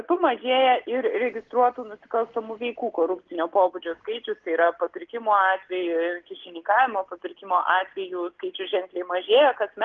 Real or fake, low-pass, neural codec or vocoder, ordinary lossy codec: real; 7.2 kHz; none; Opus, 16 kbps